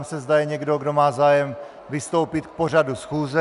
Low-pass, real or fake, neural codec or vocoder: 10.8 kHz; real; none